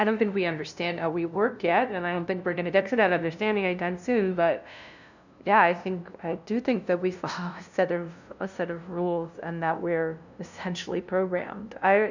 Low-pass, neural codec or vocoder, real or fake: 7.2 kHz; codec, 16 kHz, 0.5 kbps, FunCodec, trained on LibriTTS, 25 frames a second; fake